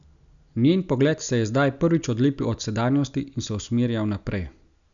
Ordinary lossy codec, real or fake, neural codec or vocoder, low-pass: none; real; none; 7.2 kHz